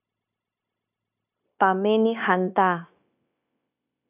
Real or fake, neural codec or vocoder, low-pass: fake; codec, 16 kHz, 0.9 kbps, LongCat-Audio-Codec; 3.6 kHz